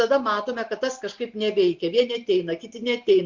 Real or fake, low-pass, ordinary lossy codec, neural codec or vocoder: real; 7.2 kHz; MP3, 64 kbps; none